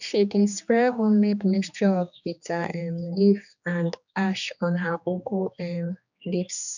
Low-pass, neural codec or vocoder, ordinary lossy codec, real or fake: 7.2 kHz; codec, 16 kHz, 2 kbps, X-Codec, HuBERT features, trained on general audio; none; fake